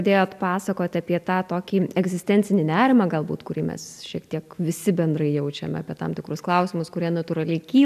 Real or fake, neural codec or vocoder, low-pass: real; none; 14.4 kHz